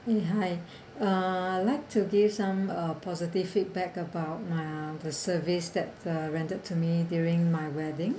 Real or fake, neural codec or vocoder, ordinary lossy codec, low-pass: real; none; none; none